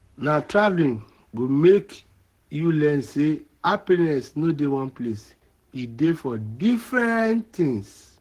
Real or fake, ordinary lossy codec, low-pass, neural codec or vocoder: fake; Opus, 16 kbps; 14.4 kHz; codec, 44.1 kHz, 7.8 kbps, Pupu-Codec